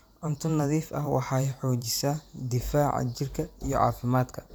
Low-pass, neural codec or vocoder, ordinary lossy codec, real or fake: none; vocoder, 44.1 kHz, 128 mel bands every 512 samples, BigVGAN v2; none; fake